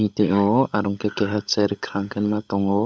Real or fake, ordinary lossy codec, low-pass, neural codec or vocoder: fake; none; none; codec, 16 kHz, 16 kbps, FunCodec, trained on LibriTTS, 50 frames a second